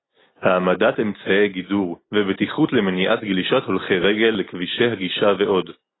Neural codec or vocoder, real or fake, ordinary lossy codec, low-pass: none; real; AAC, 16 kbps; 7.2 kHz